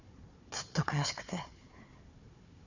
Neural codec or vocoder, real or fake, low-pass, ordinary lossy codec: codec, 16 kHz, 4 kbps, FunCodec, trained on Chinese and English, 50 frames a second; fake; 7.2 kHz; none